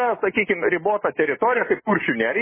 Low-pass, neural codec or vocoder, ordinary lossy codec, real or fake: 3.6 kHz; none; MP3, 16 kbps; real